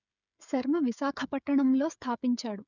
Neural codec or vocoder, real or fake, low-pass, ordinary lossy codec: codec, 16 kHz, 16 kbps, FreqCodec, smaller model; fake; 7.2 kHz; none